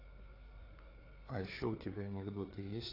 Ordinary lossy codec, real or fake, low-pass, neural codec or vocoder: AAC, 24 kbps; fake; 5.4 kHz; codec, 16 kHz, 4 kbps, FreqCodec, larger model